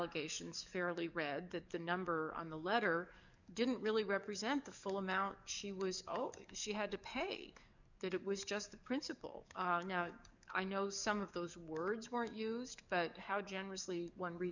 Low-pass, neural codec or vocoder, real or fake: 7.2 kHz; codec, 44.1 kHz, 7.8 kbps, DAC; fake